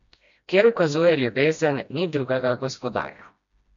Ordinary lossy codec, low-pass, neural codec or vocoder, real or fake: MP3, 64 kbps; 7.2 kHz; codec, 16 kHz, 1 kbps, FreqCodec, smaller model; fake